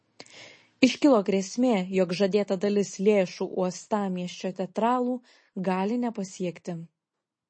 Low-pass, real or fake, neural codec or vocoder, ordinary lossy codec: 9.9 kHz; real; none; MP3, 32 kbps